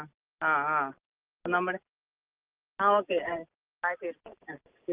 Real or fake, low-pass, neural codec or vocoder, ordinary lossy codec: real; 3.6 kHz; none; Opus, 24 kbps